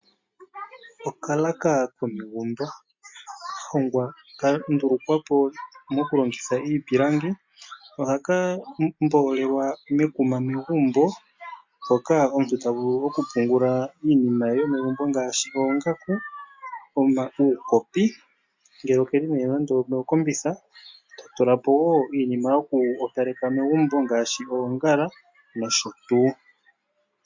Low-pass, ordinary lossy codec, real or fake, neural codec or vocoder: 7.2 kHz; MP3, 48 kbps; real; none